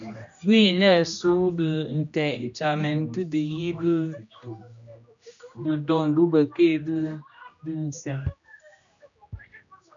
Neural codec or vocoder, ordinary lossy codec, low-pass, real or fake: codec, 16 kHz, 1 kbps, X-Codec, HuBERT features, trained on general audio; MP3, 64 kbps; 7.2 kHz; fake